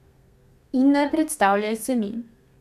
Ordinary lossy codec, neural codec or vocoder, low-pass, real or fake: none; codec, 32 kHz, 1.9 kbps, SNAC; 14.4 kHz; fake